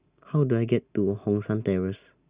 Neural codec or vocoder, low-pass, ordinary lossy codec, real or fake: none; 3.6 kHz; Opus, 64 kbps; real